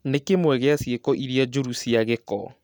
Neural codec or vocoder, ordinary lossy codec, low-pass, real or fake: none; none; 19.8 kHz; real